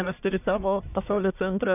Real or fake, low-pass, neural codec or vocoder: fake; 3.6 kHz; codec, 44.1 kHz, 1.7 kbps, Pupu-Codec